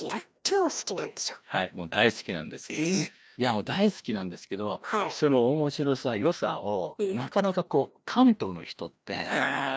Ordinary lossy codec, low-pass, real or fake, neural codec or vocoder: none; none; fake; codec, 16 kHz, 1 kbps, FreqCodec, larger model